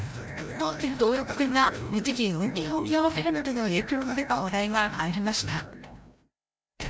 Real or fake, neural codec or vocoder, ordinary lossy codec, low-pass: fake; codec, 16 kHz, 0.5 kbps, FreqCodec, larger model; none; none